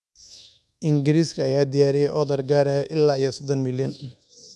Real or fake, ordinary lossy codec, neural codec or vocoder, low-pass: fake; none; codec, 24 kHz, 1.2 kbps, DualCodec; none